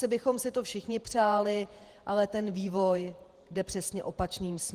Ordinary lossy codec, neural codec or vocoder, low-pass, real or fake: Opus, 24 kbps; vocoder, 48 kHz, 128 mel bands, Vocos; 14.4 kHz; fake